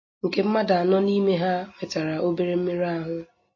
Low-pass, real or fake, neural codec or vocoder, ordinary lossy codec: 7.2 kHz; real; none; MP3, 32 kbps